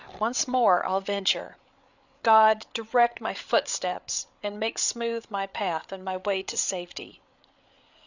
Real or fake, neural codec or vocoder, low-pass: fake; codec, 16 kHz, 8 kbps, FreqCodec, larger model; 7.2 kHz